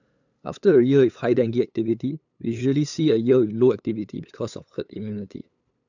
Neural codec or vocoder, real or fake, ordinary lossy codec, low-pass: codec, 16 kHz, 8 kbps, FunCodec, trained on LibriTTS, 25 frames a second; fake; none; 7.2 kHz